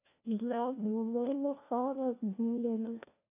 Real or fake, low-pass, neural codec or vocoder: fake; 3.6 kHz; codec, 16 kHz, 1 kbps, FunCodec, trained on LibriTTS, 50 frames a second